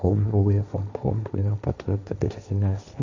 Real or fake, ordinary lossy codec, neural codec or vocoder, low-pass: fake; MP3, 64 kbps; codec, 16 kHz, 1.1 kbps, Voila-Tokenizer; 7.2 kHz